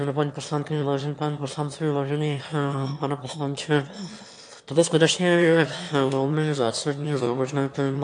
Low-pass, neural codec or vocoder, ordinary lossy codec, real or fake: 9.9 kHz; autoencoder, 22.05 kHz, a latent of 192 numbers a frame, VITS, trained on one speaker; AAC, 64 kbps; fake